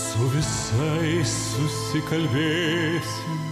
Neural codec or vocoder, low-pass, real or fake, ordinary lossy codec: none; 14.4 kHz; real; MP3, 96 kbps